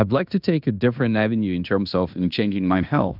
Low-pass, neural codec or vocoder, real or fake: 5.4 kHz; codec, 16 kHz in and 24 kHz out, 0.9 kbps, LongCat-Audio-Codec, fine tuned four codebook decoder; fake